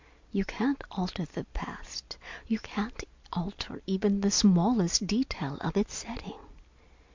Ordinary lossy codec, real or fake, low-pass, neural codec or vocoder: MP3, 64 kbps; real; 7.2 kHz; none